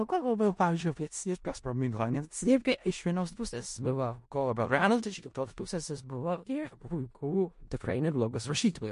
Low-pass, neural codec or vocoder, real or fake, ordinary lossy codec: 10.8 kHz; codec, 16 kHz in and 24 kHz out, 0.4 kbps, LongCat-Audio-Codec, four codebook decoder; fake; MP3, 48 kbps